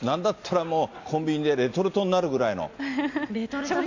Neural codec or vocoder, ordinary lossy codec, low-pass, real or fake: none; AAC, 48 kbps; 7.2 kHz; real